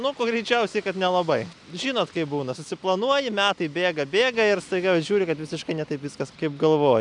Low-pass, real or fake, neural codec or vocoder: 10.8 kHz; real; none